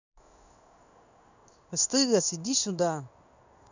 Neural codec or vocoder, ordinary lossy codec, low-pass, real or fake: codec, 16 kHz in and 24 kHz out, 1 kbps, XY-Tokenizer; none; 7.2 kHz; fake